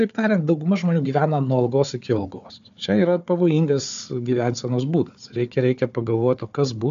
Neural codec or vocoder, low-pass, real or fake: codec, 16 kHz, 4 kbps, FunCodec, trained on Chinese and English, 50 frames a second; 7.2 kHz; fake